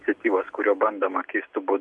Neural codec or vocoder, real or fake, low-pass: none; real; 10.8 kHz